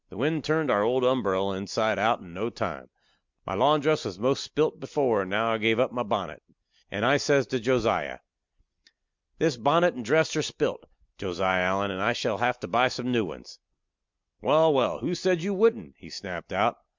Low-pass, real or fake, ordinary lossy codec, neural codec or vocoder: 7.2 kHz; real; MP3, 64 kbps; none